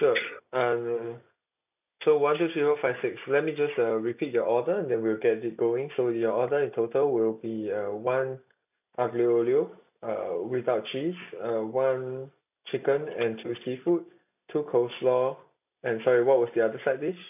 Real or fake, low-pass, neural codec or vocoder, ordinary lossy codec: real; 3.6 kHz; none; none